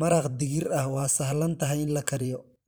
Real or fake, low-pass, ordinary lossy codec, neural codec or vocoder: fake; none; none; vocoder, 44.1 kHz, 128 mel bands every 512 samples, BigVGAN v2